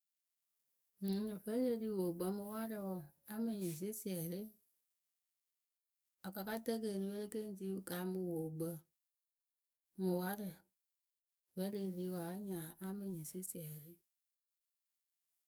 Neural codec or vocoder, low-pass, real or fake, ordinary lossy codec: codec, 44.1 kHz, 7.8 kbps, DAC; none; fake; none